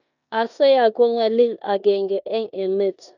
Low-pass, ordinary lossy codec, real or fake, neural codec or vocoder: 7.2 kHz; none; fake; codec, 16 kHz in and 24 kHz out, 0.9 kbps, LongCat-Audio-Codec, four codebook decoder